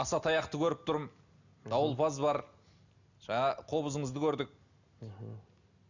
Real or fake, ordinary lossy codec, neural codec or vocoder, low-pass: real; none; none; 7.2 kHz